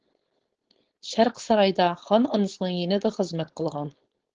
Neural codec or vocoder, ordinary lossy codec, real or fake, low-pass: codec, 16 kHz, 4.8 kbps, FACodec; Opus, 16 kbps; fake; 7.2 kHz